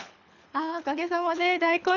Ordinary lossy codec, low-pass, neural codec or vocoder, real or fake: none; 7.2 kHz; codec, 24 kHz, 6 kbps, HILCodec; fake